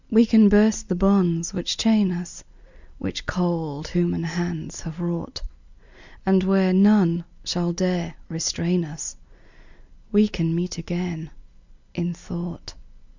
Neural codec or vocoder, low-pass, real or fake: none; 7.2 kHz; real